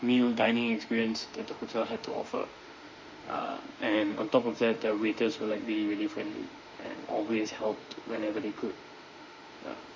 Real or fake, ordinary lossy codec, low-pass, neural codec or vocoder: fake; MP3, 48 kbps; 7.2 kHz; autoencoder, 48 kHz, 32 numbers a frame, DAC-VAE, trained on Japanese speech